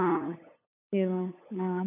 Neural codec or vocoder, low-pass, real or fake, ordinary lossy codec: codec, 16 kHz, 8 kbps, FunCodec, trained on LibriTTS, 25 frames a second; 3.6 kHz; fake; none